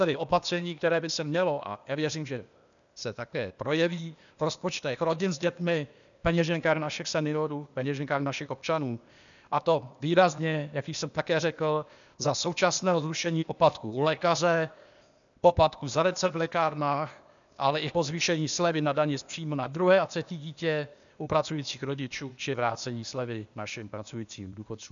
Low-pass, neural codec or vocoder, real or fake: 7.2 kHz; codec, 16 kHz, 0.8 kbps, ZipCodec; fake